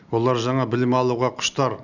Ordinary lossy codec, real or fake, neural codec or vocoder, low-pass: none; real; none; 7.2 kHz